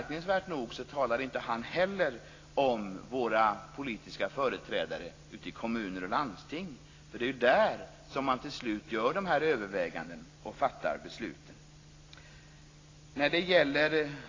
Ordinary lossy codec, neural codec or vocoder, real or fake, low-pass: AAC, 32 kbps; none; real; 7.2 kHz